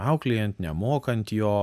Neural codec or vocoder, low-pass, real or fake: none; 14.4 kHz; real